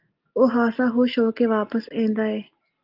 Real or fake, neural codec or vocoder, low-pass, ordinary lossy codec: real; none; 5.4 kHz; Opus, 32 kbps